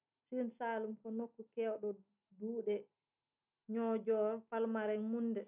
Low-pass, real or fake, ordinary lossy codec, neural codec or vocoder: 3.6 kHz; real; none; none